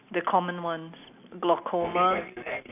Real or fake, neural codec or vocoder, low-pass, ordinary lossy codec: real; none; 3.6 kHz; none